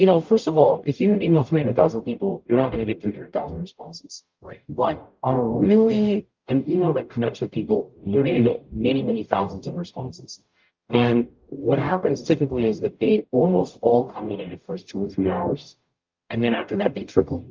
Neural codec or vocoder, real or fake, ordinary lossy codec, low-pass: codec, 44.1 kHz, 0.9 kbps, DAC; fake; Opus, 24 kbps; 7.2 kHz